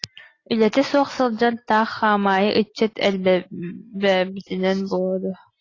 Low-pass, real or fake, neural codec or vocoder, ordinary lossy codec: 7.2 kHz; real; none; AAC, 32 kbps